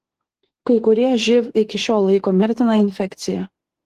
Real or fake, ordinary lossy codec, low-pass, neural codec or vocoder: fake; Opus, 16 kbps; 14.4 kHz; autoencoder, 48 kHz, 32 numbers a frame, DAC-VAE, trained on Japanese speech